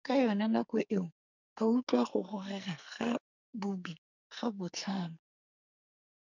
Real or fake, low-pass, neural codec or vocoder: fake; 7.2 kHz; codec, 44.1 kHz, 2.6 kbps, SNAC